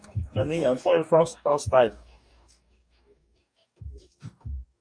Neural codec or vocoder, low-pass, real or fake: codec, 44.1 kHz, 2.6 kbps, DAC; 9.9 kHz; fake